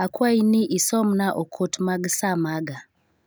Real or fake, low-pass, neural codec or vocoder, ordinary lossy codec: real; none; none; none